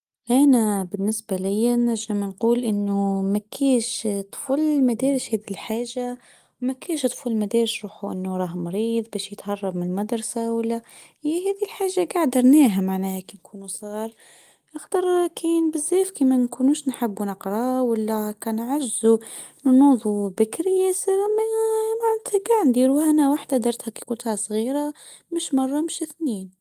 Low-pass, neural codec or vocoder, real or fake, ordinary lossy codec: 14.4 kHz; none; real; Opus, 24 kbps